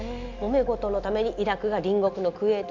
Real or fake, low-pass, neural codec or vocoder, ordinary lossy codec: real; 7.2 kHz; none; AAC, 48 kbps